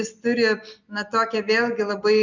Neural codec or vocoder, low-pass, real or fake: none; 7.2 kHz; real